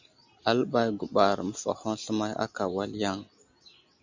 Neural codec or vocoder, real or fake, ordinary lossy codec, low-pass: none; real; MP3, 64 kbps; 7.2 kHz